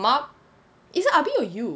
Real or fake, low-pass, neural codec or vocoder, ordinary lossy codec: real; none; none; none